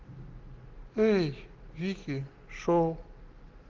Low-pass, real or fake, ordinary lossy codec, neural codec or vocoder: 7.2 kHz; real; Opus, 16 kbps; none